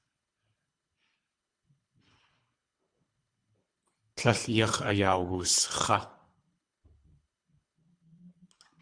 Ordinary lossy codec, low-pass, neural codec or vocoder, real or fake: Opus, 64 kbps; 9.9 kHz; codec, 24 kHz, 3 kbps, HILCodec; fake